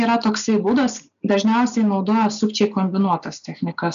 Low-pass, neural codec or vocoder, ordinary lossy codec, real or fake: 7.2 kHz; none; AAC, 96 kbps; real